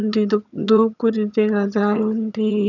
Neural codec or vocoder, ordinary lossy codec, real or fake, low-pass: vocoder, 22.05 kHz, 80 mel bands, HiFi-GAN; none; fake; 7.2 kHz